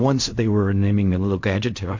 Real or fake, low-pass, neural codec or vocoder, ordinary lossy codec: fake; 7.2 kHz; codec, 16 kHz in and 24 kHz out, 0.4 kbps, LongCat-Audio-Codec, fine tuned four codebook decoder; MP3, 48 kbps